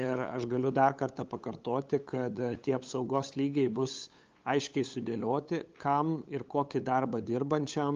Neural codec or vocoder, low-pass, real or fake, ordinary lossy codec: codec, 16 kHz, 8 kbps, FunCodec, trained on LibriTTS, 25 frames a second; 7.2 kHz; fake; Opus, 32 kbps